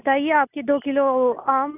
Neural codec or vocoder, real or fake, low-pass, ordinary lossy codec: none; real; 3.6 kHz; none